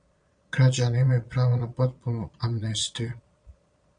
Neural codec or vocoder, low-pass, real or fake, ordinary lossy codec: vocoder, 22.05 kHz, 80 mel bands, Vocos; 9.9 kHz; fake; MP3, 96 kbps